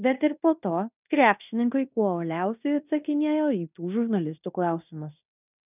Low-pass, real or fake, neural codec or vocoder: 3.6 kHz; fake; codec, 16 kHz in and 24 kHz out, 0.9 kbps, LongCat-Audio-Codec, fine tuned four codebook decoder